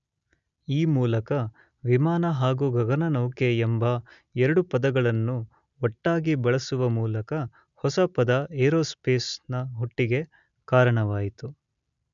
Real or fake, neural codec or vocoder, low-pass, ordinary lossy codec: real; none; 7.2 kHz; none